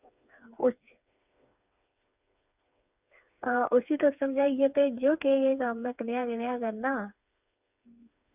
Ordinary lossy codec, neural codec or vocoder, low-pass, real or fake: none; codec, 16 kHz, 4 kbps, FreqCodec, smaller model; 3.6 kHz; fake